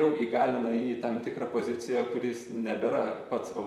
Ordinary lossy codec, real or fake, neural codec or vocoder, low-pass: MP3, 64 kbps; fake; vocoder, 44.1 kHz, 128 mel bands, Pupu-Vocoder; 19.8 kHz